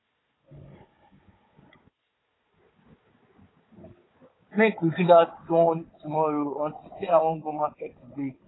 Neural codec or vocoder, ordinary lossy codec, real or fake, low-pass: codec, 16 kHz, 4 kbps, FunCodec, trained on Chinese and English, 50 frames a second; AAC, 16 kbps; fake; 7.2 kHz